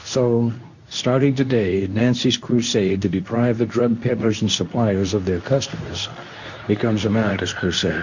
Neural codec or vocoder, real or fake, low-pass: codec, 24 kHz, 0.9 kbps, WavTokenizer, medium speech release version 2; fake; 7.2 kHz